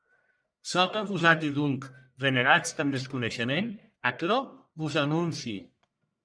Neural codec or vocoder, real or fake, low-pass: codec, 44.1 kHz, 1.7 kbps, Pupu-Codec; fake; 9.9 kHz